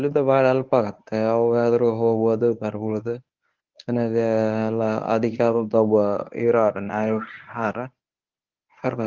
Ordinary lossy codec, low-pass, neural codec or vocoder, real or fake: Opus, 32 kbps; 7.2 kHz; codec, 24 kHz, 0.9 kbps, WavTokenizer, medium speech release version 1; fake